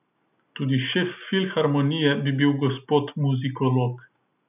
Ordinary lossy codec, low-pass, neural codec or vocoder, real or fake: none; 3.6 kHz; none; real